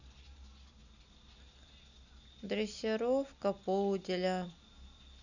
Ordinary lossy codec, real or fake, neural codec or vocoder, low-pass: none; real; none; 7.2 kHz